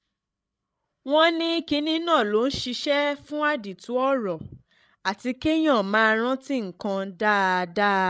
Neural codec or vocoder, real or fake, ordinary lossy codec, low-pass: codec, 16 kHz, 16 kbps, FreqCodec, larger model; fake; none; none